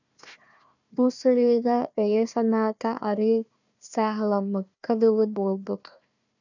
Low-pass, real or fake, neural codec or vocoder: 7.2 kHz; fake; codec, 16 kHz, 1 kbps, FunCodec, trained on Chinese and English, 50 frames a second